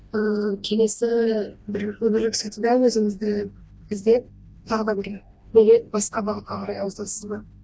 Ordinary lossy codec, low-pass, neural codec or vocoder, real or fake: none; none; codec, 16 kHz, 1 kbps, FreqCodec, smaller model; fake